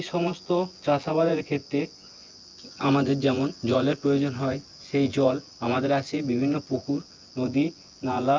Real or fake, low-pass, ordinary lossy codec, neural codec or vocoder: fake; 7.2 kHz; Opus, 24 kbps; vocoder, 24 kHz, 100 mel bands, Vocos